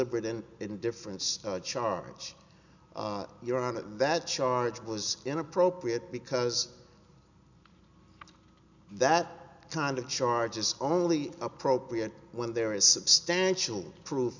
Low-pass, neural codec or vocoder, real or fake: 7.2 kHz; none; real